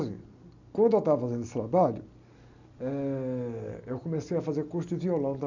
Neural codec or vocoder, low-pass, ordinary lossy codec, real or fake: none; 7.2 kHz; none; real